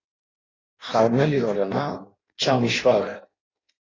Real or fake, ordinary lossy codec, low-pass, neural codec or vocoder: fake; AAC, 32 kbps; 7.2 kHz; codec, 16 kHz in and 24 kHz out, 0.6 kbps, FireRedTTS-2 codec